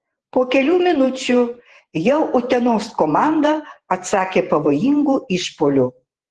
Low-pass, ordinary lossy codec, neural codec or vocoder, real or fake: 10.8 kHz; Opus, 16 kbps; vocoder, 48 kHz, 128 mel bands, Vocos; fake